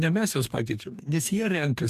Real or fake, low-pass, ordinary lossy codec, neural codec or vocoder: fake; 14.4 kHz; MP3, 96 kbps; codec, 44.1 kHz, 2.6 kbps, DAC